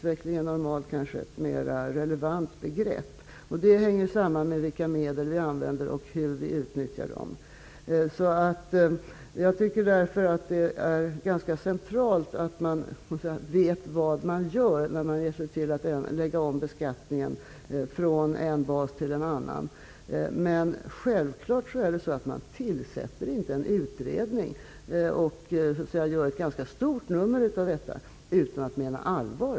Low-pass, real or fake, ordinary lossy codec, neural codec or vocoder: none; real; none; none